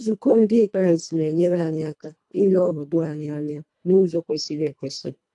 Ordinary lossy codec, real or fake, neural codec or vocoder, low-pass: AAC, 64 kbps; fake; codec, 24 kHz, 1.5 kbps, HILCodec; 10.8 kHz